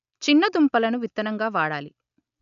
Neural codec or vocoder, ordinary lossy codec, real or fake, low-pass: none; none; real; 7.2 kHz